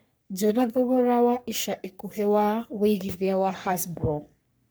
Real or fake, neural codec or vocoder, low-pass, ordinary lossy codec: fake; codec, 44.1 kHz, 3.4 kbps, Pupu-Codec; none; none